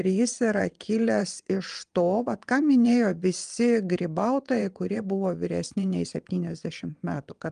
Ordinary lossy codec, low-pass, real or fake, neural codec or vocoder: Opus, 32 kbps; 9.9 kHz; fake; vocoder, 22.05 kHz, 80 mel bands, WaveNeXt